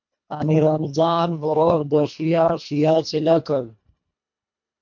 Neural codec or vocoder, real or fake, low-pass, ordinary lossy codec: codec, 24 kHz, 1.5 kbps, HILCodec; fake; 7.2 kHz; MP3, 48 kbps